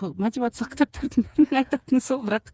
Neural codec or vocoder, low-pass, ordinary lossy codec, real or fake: codec, 16 kHz, 4 kbps, FreqCodec, smaller model; none; none; fake